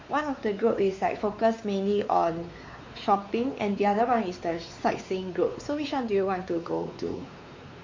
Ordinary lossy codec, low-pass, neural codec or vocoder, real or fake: MP3, 48 kbps; 7.2 kHz; codec, 16 kHz, 4 kbps, X-Codec, WavLM features, trained on Multilingual LibriSpeech; fake